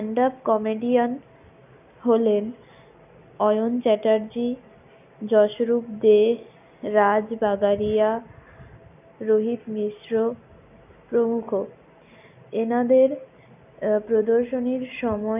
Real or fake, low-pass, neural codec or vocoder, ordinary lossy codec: real; 3.6 kHz; none; none